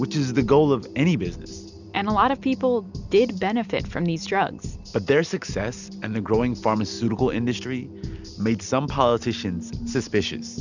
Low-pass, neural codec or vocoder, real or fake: 7.2 kHz; none; real